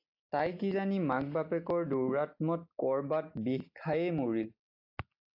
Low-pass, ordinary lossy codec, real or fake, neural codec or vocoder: 5.4 kHz; AAC, 48 kbps; real; none